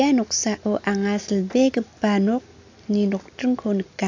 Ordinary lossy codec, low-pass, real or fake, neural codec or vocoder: AAC, 48 kbps; 7.2 kHz; real; none